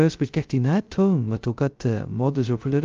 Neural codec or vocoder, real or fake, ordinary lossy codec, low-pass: codec, 16 kHz, 0.2 kbps, FocalCodec; fake; Opus, 32 kbps; 7.2 kHz